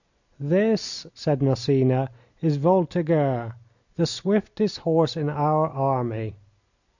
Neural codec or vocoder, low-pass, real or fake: none; 7.2 kHz; real